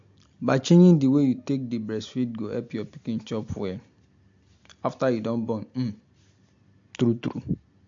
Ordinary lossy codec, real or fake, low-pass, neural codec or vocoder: MP3, 48 kbps; real; 7.2 kHz; none